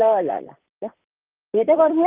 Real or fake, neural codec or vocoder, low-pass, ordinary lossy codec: fake; vocoder, 44.1 kHz, 128 mel bands, Pupu-Vocoder; 3.6 kHz; Opus, 24 kbps